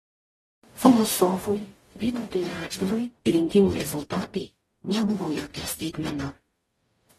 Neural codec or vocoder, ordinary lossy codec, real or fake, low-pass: codec, 44.1 kHz, 0.9 kbps, DAC; AAC, 32 kbps; fake; 19.8 kHz